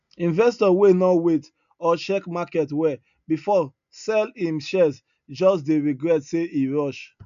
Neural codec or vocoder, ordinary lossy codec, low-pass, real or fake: none; none; 7.2 kHz; real